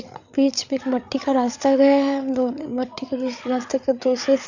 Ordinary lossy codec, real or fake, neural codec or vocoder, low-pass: none; fake; codec, 16 kHz, 8 kbps, FreqCodec, larger model; 7.2 kHz